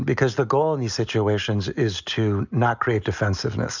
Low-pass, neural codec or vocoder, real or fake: 7.2 kHz; none; real